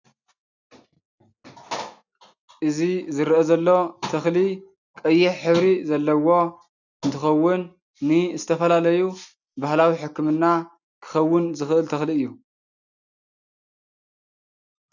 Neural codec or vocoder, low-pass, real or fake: none; 7.2 kHz; real